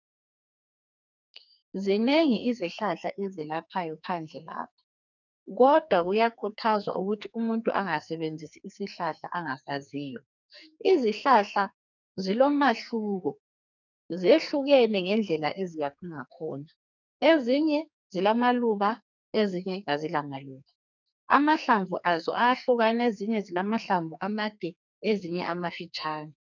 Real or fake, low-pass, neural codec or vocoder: fake; 7.2 kHz; codec, 44.1 kHz, 2.6 kbps, SNAC